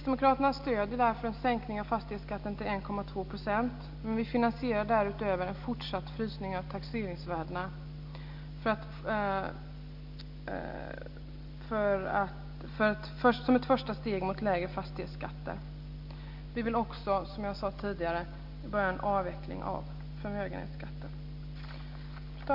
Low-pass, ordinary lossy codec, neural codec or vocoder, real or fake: 5.4 kHz; none; none; real